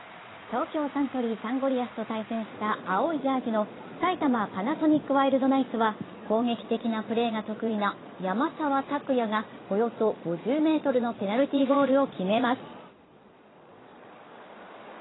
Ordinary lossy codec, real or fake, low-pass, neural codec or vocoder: AAC, 16 kbps; fake; 7.2 kHz; vocoder, 22.05 kHz, 80 mel bands, Vocos